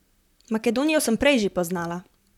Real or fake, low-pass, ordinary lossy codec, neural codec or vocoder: fake; 19.8 kHz; none; vocoder, 44.1 kHz, 128 mel bands, Pupu-Vocoder